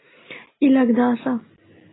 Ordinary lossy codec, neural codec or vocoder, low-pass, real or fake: AAC, 16 kbps; none; 7.2 kHz; real